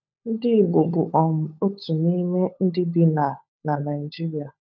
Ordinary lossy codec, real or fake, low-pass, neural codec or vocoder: none; fake; 7.2 kHz; codec, 16 kHz, 16 kbps, FunCodec, trained on LibriTTS, 50 frames a second